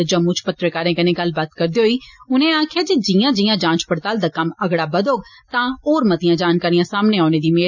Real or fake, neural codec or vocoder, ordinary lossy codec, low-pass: real; none; none; 7.2 kHz